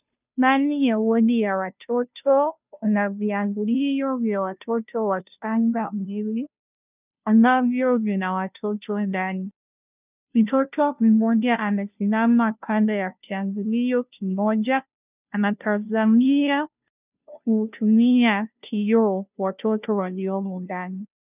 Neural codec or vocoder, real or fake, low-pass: codec, 16 kHz, 0.5 kbps, FunCodec, trained on Chinese and English, 25 frames a second; fake; 3.6 kHz